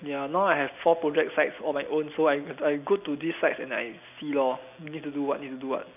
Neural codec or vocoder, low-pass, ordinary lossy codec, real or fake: none; 3.6 kHz; none; real